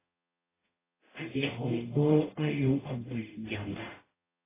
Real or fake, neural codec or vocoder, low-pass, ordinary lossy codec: fake; codec, 44.1 kHz, 0.9 kbps, DAC; 3.6 kHz; AAC, 16 kbps